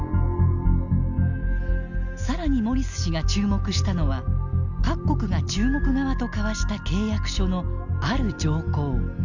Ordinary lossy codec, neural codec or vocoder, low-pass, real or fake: none; none; 7.2 kHz; real